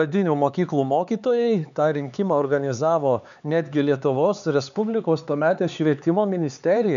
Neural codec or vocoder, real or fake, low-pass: codec, 16 kHz, 4 kbps, X-Codec, HuBERT features, trained on LibriSpeech; fake; 7.2 kHz